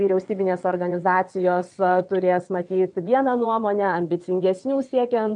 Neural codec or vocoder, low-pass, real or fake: vocoder, 22.05 kHz, 80 mel bands, WaveNeXt; 9.9 kHz; fake